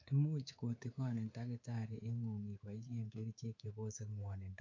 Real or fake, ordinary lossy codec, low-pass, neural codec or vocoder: fake; AAC, 48 kbps; 7.2 kHz; codec, 24 kHz, 3.1 kbps, DualCodec